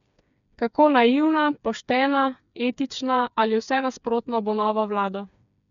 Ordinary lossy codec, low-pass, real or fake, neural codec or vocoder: none; 7.2 kHz; fake; codec, 16 kHz, 4 kbps, FreqCodec, smaller model